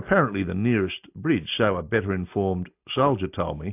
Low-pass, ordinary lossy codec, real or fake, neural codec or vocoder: 3.6 kHz; AAC, 32 kbps; real; none